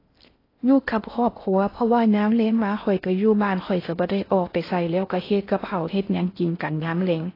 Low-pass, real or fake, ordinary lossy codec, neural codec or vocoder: 5.4 kHz; fake; AAC, 24 kbps; codec, 16 kHz in and 24 kHz out, 0.6 kbps, FocalCodec, streaming, 4096 codes